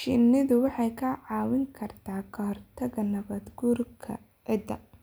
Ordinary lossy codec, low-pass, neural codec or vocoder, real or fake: none; none; none; real